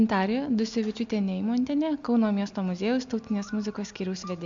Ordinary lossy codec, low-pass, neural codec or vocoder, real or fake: AAC, 64 kbps; 7.2 kHz; none; real